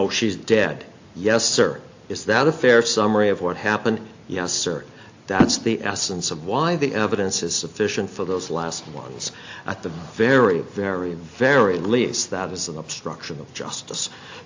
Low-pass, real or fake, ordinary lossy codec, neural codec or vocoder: 7.2 kHz; real; AAC, 48 kbps; none